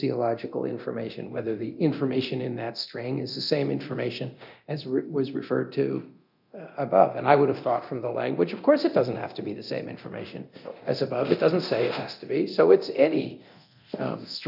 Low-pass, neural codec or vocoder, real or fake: 5.4 kHz; codec, 24 kHz, 0.9 kbps, DualCodec; fake